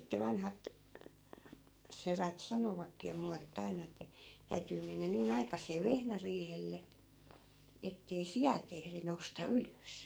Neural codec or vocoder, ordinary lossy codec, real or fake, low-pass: codec, 44.1 kHz, 2.6 kbps, SNAC; none; fake; none